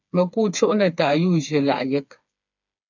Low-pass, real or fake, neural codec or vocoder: 7.2 kHz; fake; codec, 16 kHz, 4 kbps, FreqCodec, smaller model